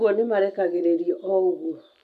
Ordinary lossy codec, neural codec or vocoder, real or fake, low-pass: AAC, 96 kbps; none; real; 14.4 kHz